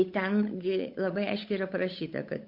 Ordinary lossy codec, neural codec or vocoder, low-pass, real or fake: MP3, 32 kbps; codec, 16 kHz, 8 kbps, FunCodec, trained on Chinese and English, 25 frames a second; 5.4 kHz; fake